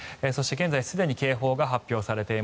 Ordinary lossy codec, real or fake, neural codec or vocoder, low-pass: none; real; none; none